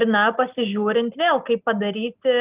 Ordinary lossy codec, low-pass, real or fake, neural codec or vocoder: Opus, 32 kbps; 3.6 kHz; real; none